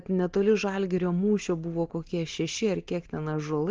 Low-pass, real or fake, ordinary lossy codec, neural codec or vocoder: 7.2 kHz; real; Opus, 16 kbps; none